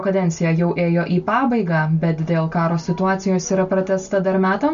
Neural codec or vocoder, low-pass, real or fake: none; 7.2 kHz; real